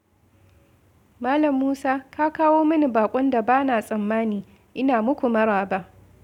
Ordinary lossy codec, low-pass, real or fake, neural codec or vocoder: none; 19.8 kHz; real; none